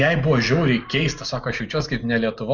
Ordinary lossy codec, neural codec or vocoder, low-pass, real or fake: Opus, 64 kbps; none; 7.2 kHz; real